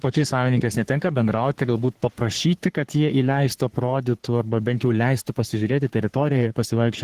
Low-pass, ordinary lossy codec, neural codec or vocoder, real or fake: 14.4 kHz; Opus, 16 kbps; codec, 44.1 kHz, 3.4 kbps, Pupu-Codec; fake